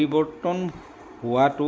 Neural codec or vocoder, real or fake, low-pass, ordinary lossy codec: none; real; none; none